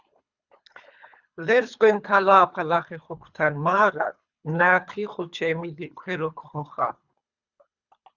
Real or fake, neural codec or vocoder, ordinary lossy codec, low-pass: fake; codec, 24 kHz, 3 kbps, HILCodec; Opus, 64 kbps; 7.2 kHz